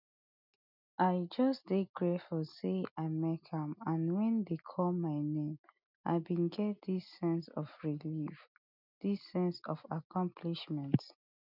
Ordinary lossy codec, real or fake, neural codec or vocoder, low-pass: none; real; none; 5.4 kHz